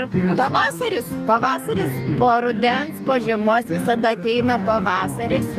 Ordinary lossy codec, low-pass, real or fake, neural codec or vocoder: MP3, 96 kbps; 14.4 kHz; fake; codec, 44.1 kHz, 2.6 kbps, DAC